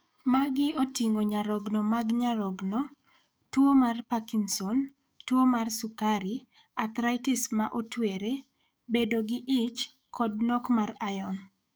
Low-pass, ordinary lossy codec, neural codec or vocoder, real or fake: none; none; codec, 44.1 kHz, 7.8 kbps, DAC; fake